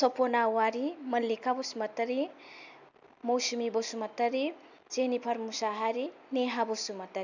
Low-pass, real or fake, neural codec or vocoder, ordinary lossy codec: 7.2 kHz; real; none; none